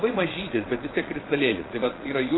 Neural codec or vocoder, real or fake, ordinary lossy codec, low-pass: vocoder, 22.05 kHz, 80 mel bands, Vocos; fake; AAC, 16 kbps; 7.2 kHz